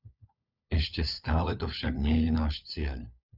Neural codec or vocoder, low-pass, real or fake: codec, 16 kHz, 4 kbps, FunCodec, trained on LibriTTS, 50 frames a second; 5.4 kHz; fake